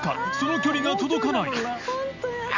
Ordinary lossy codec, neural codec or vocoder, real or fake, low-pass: none; none; real; 7.2 kHz